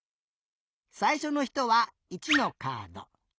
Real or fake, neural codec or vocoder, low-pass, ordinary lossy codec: real; none; none; none